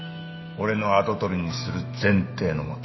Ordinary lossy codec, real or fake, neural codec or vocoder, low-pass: MP3, 24 kbps; fake; autoencoder, 48 kHz, 128 numbers a frame, DAC-VAE, trained on Japanese speech; 7.2 kHz